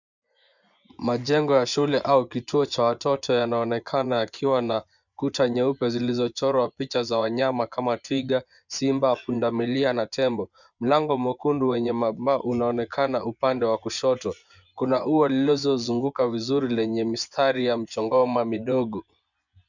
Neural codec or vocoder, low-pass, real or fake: vocoder, 24 kHz, 100 mel bands, Vocos; 7.2 kHz; fake